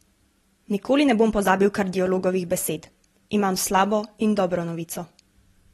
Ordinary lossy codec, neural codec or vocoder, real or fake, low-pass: AAC, 32 kbps; none; real; 19.8 kHz